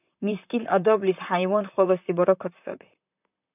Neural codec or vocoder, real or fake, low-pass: vocoder, 44.1 kHz, 128 mel bands, Pupu-Vocoder; fake; 3.6 kHz